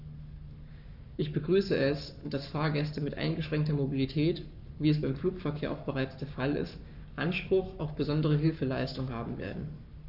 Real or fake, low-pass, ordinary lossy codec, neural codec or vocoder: fake; 5.4 kHz; none; codec, 44.1 kHz, 7.8 kbps, Pupu-Codec